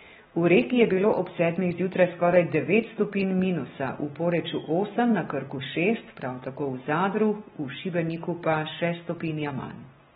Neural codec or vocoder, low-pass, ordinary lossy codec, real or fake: none; 19.8 kHz; AAC, 16 kbps; real